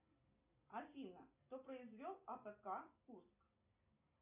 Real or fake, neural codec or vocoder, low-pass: real; none; 3.6 kHz